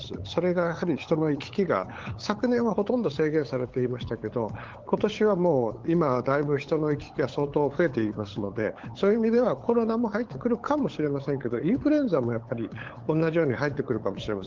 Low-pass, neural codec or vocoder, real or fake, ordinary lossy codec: 7.2 kHz; codec, 16 kHz, 8 kbps, FunCodec, trained on LibriTTS, 25 frames a second; fake; Opus, 16 kbps